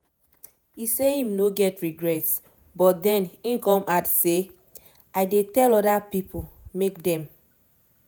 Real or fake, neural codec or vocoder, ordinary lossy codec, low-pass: fake; vocoder, 48 kHz, 128 mel bands, Vocos; none; none